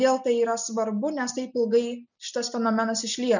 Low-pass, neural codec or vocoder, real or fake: 7.2 kHz; none; real